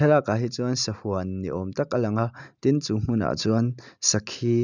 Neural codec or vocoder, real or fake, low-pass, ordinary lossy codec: none; real; 7.2 kHz; none